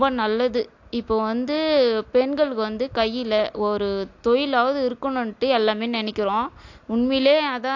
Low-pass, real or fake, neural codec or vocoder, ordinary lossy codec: 7.2 kHz; real; none; AAC, 48 kbps